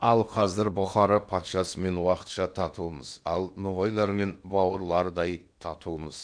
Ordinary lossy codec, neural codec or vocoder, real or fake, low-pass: none; codec, 16 kHz in and 24 kHz out, 0.8 kbps, FocalCodec, streaming, 65536 codes; fake; 9.9 kHz